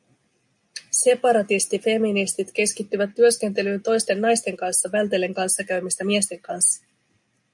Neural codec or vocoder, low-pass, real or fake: none; 10.8 kHz; real